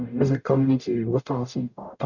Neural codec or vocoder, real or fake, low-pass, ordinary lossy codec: codec, 44.1 kHz, 0.9 kbps, DAC; fake; 7.2 kHz; none